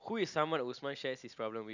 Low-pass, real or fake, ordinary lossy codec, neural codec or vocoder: 7.2 kHz; real; none; none